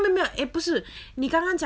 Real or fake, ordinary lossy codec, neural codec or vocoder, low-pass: real; none; none; none